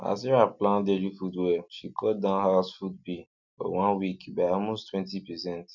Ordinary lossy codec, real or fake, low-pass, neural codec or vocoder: none; real; 7.2 kHz; none